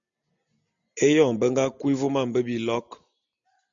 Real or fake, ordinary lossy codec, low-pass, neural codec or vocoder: real; MP3, 64 kbps; 7.2 kHz; none